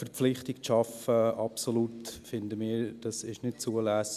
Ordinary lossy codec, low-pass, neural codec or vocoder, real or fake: none; 14.4 kHz; none; real